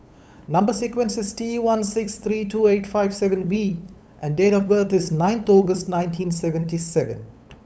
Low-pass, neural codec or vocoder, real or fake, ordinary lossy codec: none; codec, 16 kHz, 8 kbps, FunCodec, trained on LibriTTS, 25 frames a second; fake; none